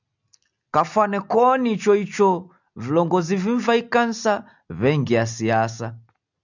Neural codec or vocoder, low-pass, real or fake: none; 7.2 kHz; real